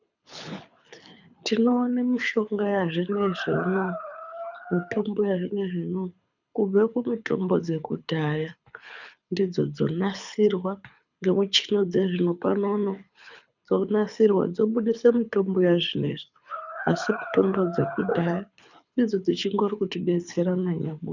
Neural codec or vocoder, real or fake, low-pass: codec, 24 kHz, 6 kbps, HILCodec; fake; 7.2 kHz